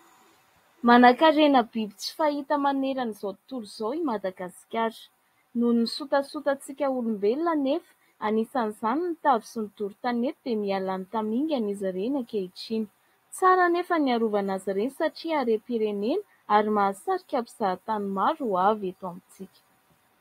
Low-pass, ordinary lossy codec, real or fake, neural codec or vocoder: 19.8 kHz; AAC, 48 kbps; real; none